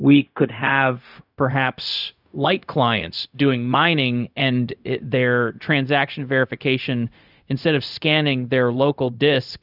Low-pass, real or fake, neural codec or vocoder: 5.4 kHz; fake; codec, 16 kHz, 0.4 kbps, LongCat-Audio-Codec